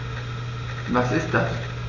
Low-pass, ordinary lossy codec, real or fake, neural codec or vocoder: 7.2 kHz; none; real; none